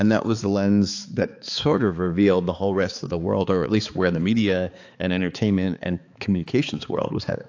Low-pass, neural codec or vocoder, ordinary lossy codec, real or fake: 7.2 kHz; codec, 16 kHz, 4 kbps, X-Codec, HuBERT features, trained on balanced general audio; AAC, 48 kbps; fake